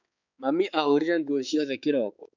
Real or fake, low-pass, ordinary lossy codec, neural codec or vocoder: fake; 7.2 kHz; none; codec, 16 kHz, 4 kbps, X-Codec, HuBERT features, trained on balanced general audio